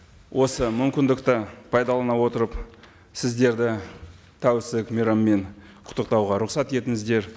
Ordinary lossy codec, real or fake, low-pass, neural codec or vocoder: none; real; none; none